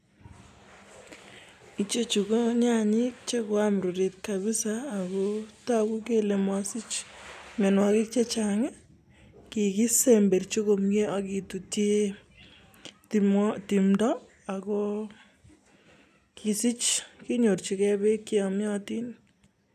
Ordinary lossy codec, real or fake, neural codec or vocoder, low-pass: none; real; none; 14.4 kHz